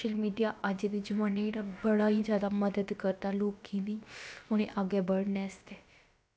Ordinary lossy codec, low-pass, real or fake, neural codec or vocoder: none; none; fake; codec, 16 kHz, about 1 kbps, DyCAST, with the encoder's durations